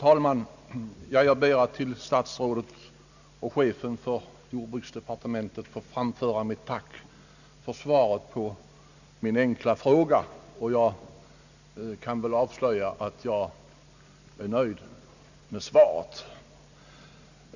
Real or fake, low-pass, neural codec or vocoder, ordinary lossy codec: real; 7.2 kHz; none; none